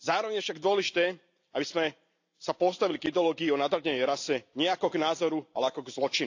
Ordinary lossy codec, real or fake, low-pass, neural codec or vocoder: AAC, 48 kbps; real; 7.2 kHz; none